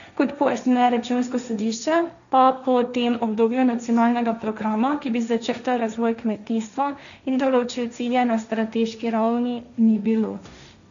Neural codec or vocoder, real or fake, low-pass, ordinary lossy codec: codec, 16 kHz, 1.1 kbps, Voila-Tokenizer; fake; 7.2 kHz; none